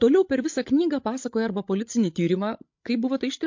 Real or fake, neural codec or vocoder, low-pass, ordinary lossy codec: fake; codec, 16 kHz, 16 kbps, FreqCodec, larger model; 7.2 kHz; MP3, 48 kbps